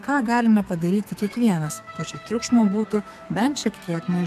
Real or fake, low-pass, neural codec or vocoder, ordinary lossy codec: fake; 14.4 kHz; codec, 32 kHz, 1.9 kbps, SNAC; MP3, 96 kbps